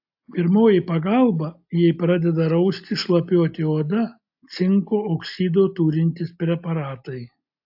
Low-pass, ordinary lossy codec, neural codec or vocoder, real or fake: 5.4 kHz; AAC, 48 kbps; none; real